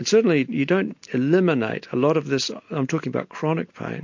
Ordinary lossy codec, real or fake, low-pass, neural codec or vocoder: MP3, 48 kbps; real; 7.2 kHz; none